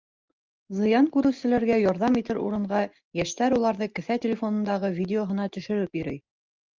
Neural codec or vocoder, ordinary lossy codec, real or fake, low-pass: none; Opus, 24 kbps; real; 7.2 kHz